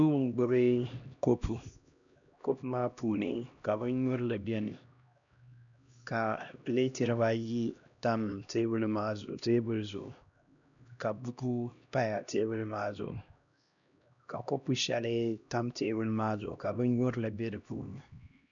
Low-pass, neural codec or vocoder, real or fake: 7.2 kHz; codec, 16 kHz, 1 kbps, X-Codec, HuBERT features, trained on LibriSpeech; fake